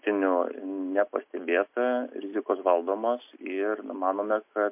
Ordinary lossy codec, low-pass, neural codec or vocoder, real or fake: MP3, 32 kbps; 3.6 kHz; none; real